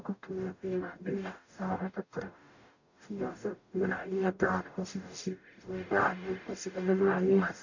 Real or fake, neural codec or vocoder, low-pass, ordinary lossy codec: fake; codec, 44.1 kHz, 0.9 kbps, DAC; 7.2 kHz; none